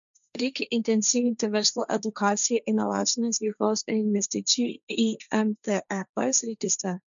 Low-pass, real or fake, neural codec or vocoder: 7.2 kHz; fake; codec, 16 kHz, 1.1 kbps, Voila-Tokenizer